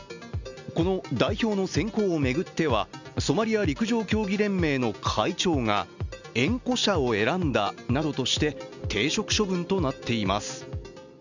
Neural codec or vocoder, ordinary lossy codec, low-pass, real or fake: none; none; 7.2 kHz; real